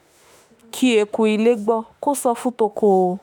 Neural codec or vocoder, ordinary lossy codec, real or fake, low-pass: autoencoder, 48 kHz, 32 numbers a frame, DAC-VAE, trained on Japanese speech; none; fake; none